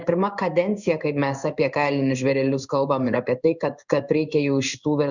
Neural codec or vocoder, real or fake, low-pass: codec, 16 kHz in and 24 kHz out, 1 kbps, XY-Tokenizer; fake; 7.2 kHz